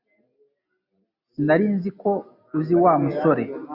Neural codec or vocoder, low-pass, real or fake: none; 5.4 kHz; real